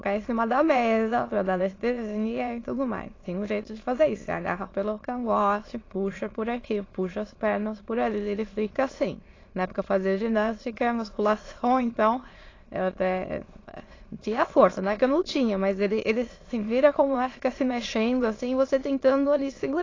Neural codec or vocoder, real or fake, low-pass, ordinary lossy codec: autoencoder, 22.05 kHz, a latent of 192 numbers a frame, VITS, trained on many speakers; fake; 7.2 kHz; AAC, 32 kbps